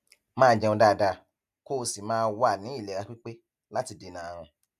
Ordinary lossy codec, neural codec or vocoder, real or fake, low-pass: none; none; real; 14.4 kHz